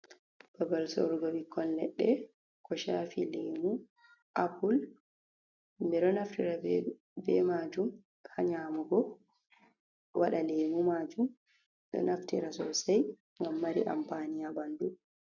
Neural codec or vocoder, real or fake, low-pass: none; real; 7.2 kHz